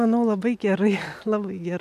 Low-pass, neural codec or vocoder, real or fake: 14.4 kHz; none; real